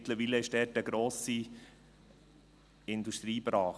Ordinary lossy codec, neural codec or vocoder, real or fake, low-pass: none; none; real; none